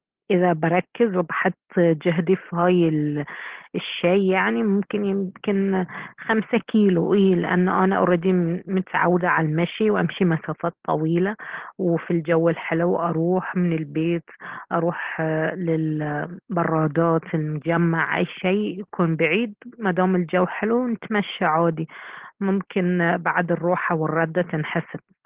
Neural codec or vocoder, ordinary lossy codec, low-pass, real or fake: none; Opus, 16 kbps; 3.6 kHz; real